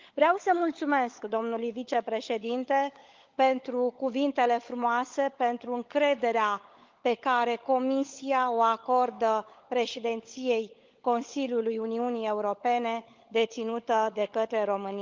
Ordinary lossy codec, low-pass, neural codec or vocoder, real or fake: Opus, 24 kbps; 7.2 kHz; codec, 16 kHz, 16 kbps, FunCodec, trained on LibriTTS, 50 frames a second; fake